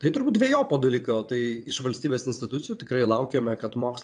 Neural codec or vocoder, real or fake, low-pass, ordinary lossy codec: vocoder, 22.05 kHz, 80 mel bands, WaveNeXt; fake; 9.9 kHz; AAC, 64 kbps